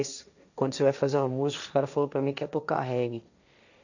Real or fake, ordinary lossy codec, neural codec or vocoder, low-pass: fake; none; codec, 16 kHz, 1.1 kbps, Voila-Tokenizer; 7.2 kHz